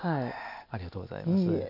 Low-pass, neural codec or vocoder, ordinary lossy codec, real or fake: 5.4 kHz; none; none; real